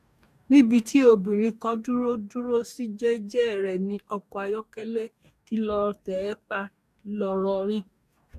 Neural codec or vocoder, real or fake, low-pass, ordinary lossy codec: codec, 44.1 kHz, 2.6 kbps, DAC; fake; 14.4 kHz; none